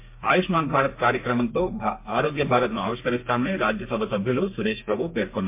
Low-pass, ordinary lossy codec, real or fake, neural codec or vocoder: 3.6 kHz; MP3, 24 kbps; fake; codec, 32 kHz, 1.9 kbps, SNAC